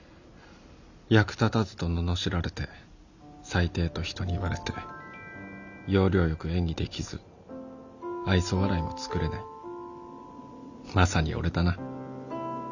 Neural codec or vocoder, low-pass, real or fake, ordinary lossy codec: none; 7.2 kHz; real; none